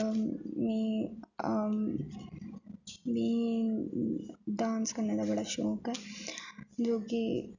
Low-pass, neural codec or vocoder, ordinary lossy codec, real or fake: 7.2 kHz; none; none; real